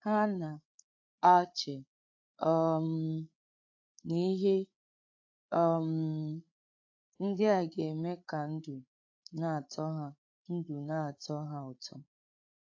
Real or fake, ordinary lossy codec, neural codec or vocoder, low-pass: fake; AAC, 48 kbps; codec, 16 kHz, 8 kbps, FreqCodec, larger model; 7.2 kHz